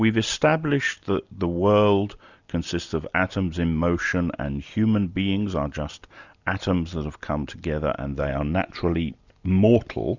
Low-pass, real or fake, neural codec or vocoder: 7.2 kHz; real; none